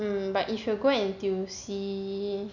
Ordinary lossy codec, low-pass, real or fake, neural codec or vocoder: none; 7.2 kHz; real; none